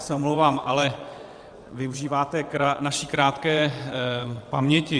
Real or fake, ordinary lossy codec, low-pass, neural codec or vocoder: fake; Opus, 64 kbps; 9.9 kHz; vocoder, 22.05 kHz, 80 mel bands, WaveNeXt